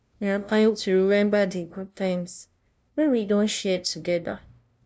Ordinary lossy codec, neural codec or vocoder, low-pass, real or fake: none; codec, 16 kHz, 0.5 kbps, FunCodec, trained on LibriTTS, 25 frames a second; none; fake